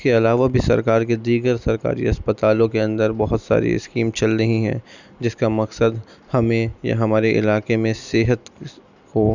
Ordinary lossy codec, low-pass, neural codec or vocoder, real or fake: none; 7.2 kHz; none; real